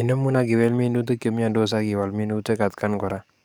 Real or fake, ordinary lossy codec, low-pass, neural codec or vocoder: fake; none; none; codec, 44.1 kHz, 7.8 kbps, DAC